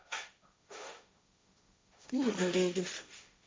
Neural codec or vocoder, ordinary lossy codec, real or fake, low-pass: codec, 16 kHz, 1.1 kbps, Voila-Tokenizer; none; fake; none